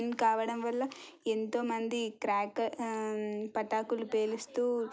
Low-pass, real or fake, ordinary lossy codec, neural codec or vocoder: none; real; none; none